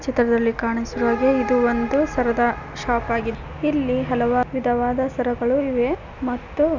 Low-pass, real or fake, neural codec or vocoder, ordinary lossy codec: 7.2 kHz; real; none; none